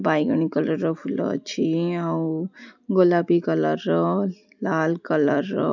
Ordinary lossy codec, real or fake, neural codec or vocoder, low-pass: none; real; none; 7.2 kHz